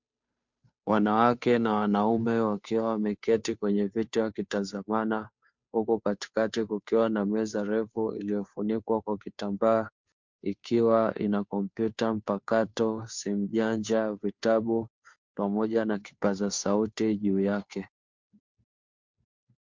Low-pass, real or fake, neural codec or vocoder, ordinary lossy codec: 7.2 kHz; fake; codec, 16 kHz, 2 kbps, FunCodec, trained on Chinese and English, 25 frames a second; MP3, 64 kbps